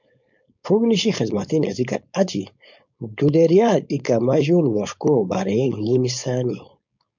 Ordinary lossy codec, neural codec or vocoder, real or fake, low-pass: MP3, 64 kbps; codec, 16 kHz, 4.8 kbps, FACodec; fake; 7.2 kHz